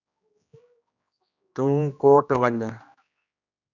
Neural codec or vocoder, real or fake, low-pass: codec, 16 kHz, 1 kbps, X-Codec, HuBERT features, trained on general audio; fake; 7.2 kHz